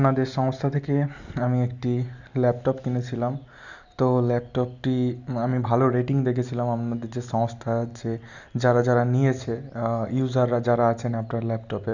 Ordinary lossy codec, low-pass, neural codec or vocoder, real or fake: none; 7.2 kHz; none; real